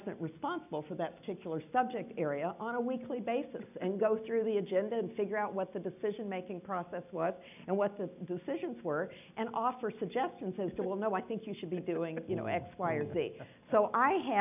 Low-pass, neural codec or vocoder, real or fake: 3.6 kHz; none; real